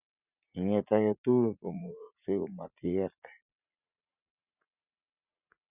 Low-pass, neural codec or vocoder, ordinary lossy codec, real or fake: 3.6 kHz; vocoder, 44.1 kHz, 80 mel bands, Vocos; none; fake